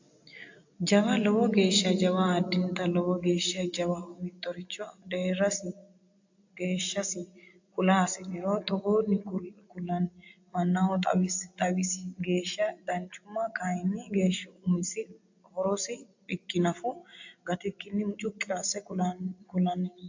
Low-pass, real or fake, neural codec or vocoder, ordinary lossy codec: 7.2 kHz; real; none; AAC, 48 kbps